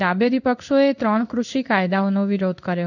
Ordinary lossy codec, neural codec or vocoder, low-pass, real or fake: none; codec, 16 kHz in and 24 kHz out, 1 kbps, XY-Tokenizer; 7.2 kHz; fake